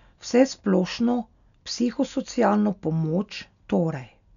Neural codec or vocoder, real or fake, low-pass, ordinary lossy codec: none; real; 7.2 kHz; none